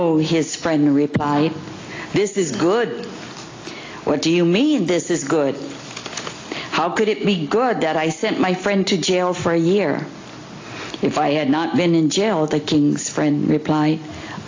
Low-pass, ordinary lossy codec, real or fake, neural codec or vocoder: 7.2 kHz; AAC, 32 kbps; real; none